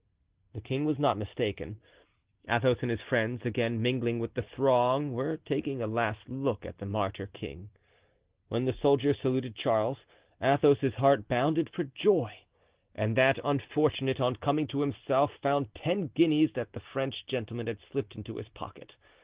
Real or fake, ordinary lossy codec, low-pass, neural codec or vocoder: real; Opus, 16 kbps; 3.6 kHz; none